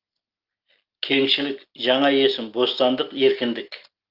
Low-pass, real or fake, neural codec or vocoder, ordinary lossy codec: 5.4 kHz; real; none; Opus, 32 kbps